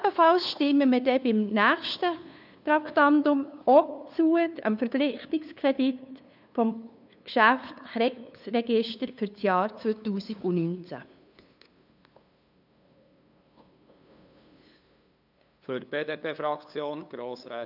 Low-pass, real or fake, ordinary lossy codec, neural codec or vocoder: 5.4 kHz; fake; none; codec, 16 kHz, 2 kbps, FunCodec, trained on LibriTTS, 25 frames a second